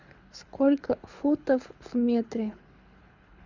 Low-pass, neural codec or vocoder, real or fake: 7.2 kHz; codec, 24 kHz, 6 kbps, HILCodec; fake